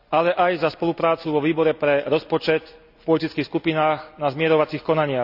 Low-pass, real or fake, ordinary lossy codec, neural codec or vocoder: 5.4 kHz; real; none; none